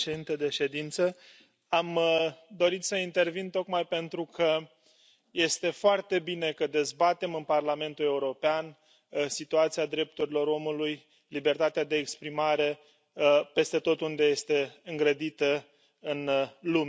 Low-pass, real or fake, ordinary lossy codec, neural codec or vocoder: none; real; none; none